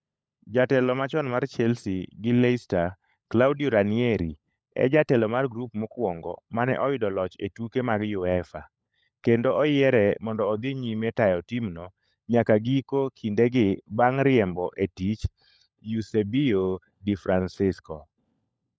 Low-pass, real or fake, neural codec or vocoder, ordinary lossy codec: none; fake; codec, 16 kHz, 16 kbps, FunCodec, trained on LibriTTS, 50 frames a second; none